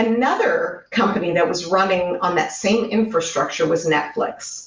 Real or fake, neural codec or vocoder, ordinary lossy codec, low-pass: real; none; Opus, 32 kbps; 7.2 kHz